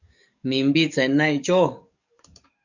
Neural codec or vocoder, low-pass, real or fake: codec, 44.1 kHz, 7.8 kbps, DAC; 7.2 kHz; fake